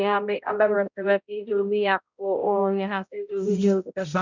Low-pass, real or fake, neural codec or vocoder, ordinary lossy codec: 7.2 kHz; fake; codec, 16 kHz, 0.5 kbps, X-Codec, HuBERT features, trained on balanced general audio; none